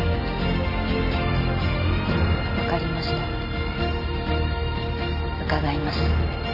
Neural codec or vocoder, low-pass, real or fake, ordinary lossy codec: none; 5.4 kHz; real; none